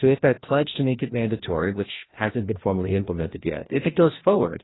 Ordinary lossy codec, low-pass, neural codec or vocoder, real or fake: AAC, 16 kbps; 7.2 kHz; codec, 16 kHz, 1 kbps, FreqCodec, larger model; fake